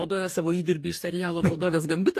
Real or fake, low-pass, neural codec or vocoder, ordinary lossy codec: fake; 14.4 kHz; codec, 44.1 kHz, 2.6 kbps, DAC; AAC, 64 kbps